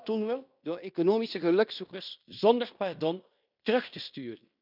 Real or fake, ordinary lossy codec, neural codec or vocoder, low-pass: fake; none; codec, 16 kHz in and 24 kHz out, 0.9 kbps, LongCat-Audio-Codec, fine tuned four codebook decoder; 5.4 kHz